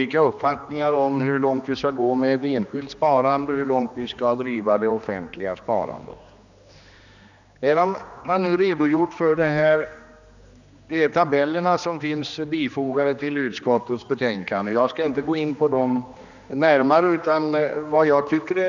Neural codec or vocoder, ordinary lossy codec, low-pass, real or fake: codec, 16 kHz, 2 kbps, X-Codec, HuBERT features, trained on general audio; none; 7.2 kHz; fake